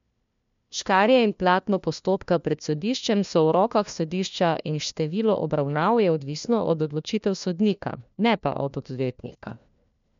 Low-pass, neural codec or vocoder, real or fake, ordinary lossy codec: 7.2 kHz; codec, 16 kHz, 1 kbps, FunCodec, trained on LibriTTS, 50 frames a second; fake; MP3, 64 kbps